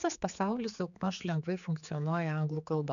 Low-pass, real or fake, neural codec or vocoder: 7.2 kHz; fake; codec, 16 kHz, 4 kbps, X-Codec, HuBERT features, trained on general audio